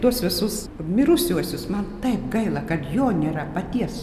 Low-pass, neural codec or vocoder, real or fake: 14.4 kHz; none; real